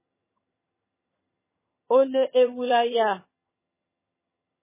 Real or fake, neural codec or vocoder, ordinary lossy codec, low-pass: fake; vocoder, 22.05 kHz, 80 mel bands, HiFi-GAN; MP3, 16 kbps; 3.6 kHz